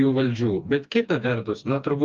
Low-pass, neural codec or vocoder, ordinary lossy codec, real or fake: 7.2 kHz; codec, 16 kHz, 2 kbps, FreqCodec, smaller model; Opus, 24 kbps; fake